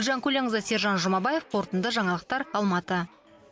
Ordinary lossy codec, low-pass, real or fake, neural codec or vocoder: none; none; real; none